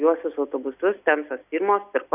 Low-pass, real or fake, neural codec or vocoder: 3.6 kHz; real; none